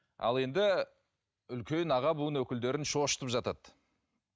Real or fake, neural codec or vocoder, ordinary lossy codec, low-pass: real; none; none; none